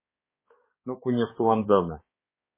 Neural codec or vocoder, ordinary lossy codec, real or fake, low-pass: codec, 16 kHz, 2 kbps, X-Codec, HuBERT features, trained on balanced general audio; MP3, 16 kbps; fake; 3.6 kHz